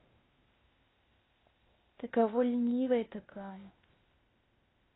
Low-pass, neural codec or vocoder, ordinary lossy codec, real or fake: 7.2 kHz; codec, 16 kHz, 0.8 kbps, ZipCodec; AAC, 16 kbps; fake